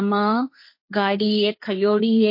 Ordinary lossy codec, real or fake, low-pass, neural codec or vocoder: MP3, 32 kbps; fake; 5.4 kHz; codec, 16 kHz, 1.1 kbps, Voila-Tokenizer